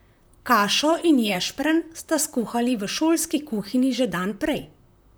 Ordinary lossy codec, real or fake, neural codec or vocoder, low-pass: none; fake; vocoder, 44.1 kHz, 128 mel bands, Pupu-Vocoder; none